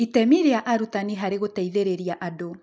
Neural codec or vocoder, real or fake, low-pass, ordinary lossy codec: none; real; none; none